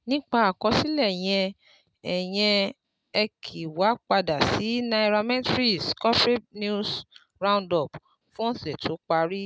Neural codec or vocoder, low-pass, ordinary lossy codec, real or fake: none; none; none; real